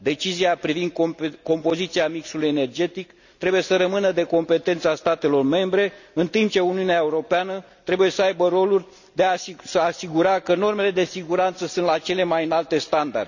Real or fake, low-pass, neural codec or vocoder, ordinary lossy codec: real; 7.2 kHz; none; none